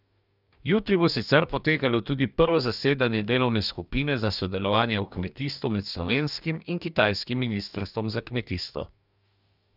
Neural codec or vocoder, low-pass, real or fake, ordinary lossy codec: codec, 44.1 kHz, 2.6 kbps, DAC; 5.4 kHz; fake; none